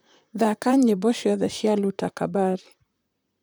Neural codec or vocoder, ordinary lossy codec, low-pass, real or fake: vocoder, 44.1 kHz, 128 mel bands, Pupu-Vocoder; none; none; fake